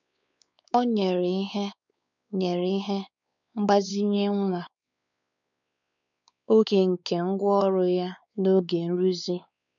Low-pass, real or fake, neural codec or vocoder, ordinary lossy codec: 7.2 kHz; fake; codec, 16 kHz, 4 kbps, X-Codec, WavLM features, trained on Multilingual LibriSpeech; none